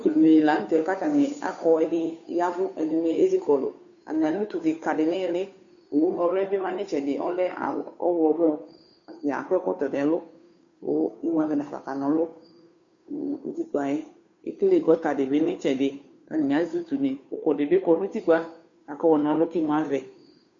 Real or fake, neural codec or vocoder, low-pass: fake; codec, 16 kHz, 2 kbps, FunCodec, trained on Chinese and English, 25 frames a second; 7.2 kHz